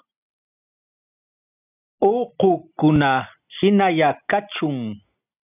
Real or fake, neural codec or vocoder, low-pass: real; none; 3.6 kHz